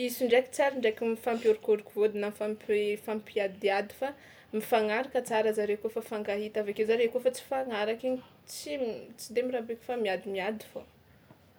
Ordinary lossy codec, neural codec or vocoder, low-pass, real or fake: none; none; none; real